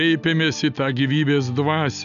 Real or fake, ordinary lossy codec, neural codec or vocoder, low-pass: real; MP3, 96 kbps; none; 7.2 kHz